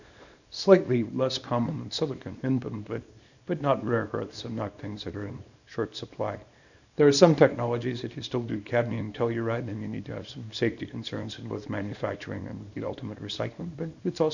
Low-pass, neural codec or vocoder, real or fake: 7.2 kHz; codec, 24 kHz, 0.9 kbps, WavTokenizer, small release; fake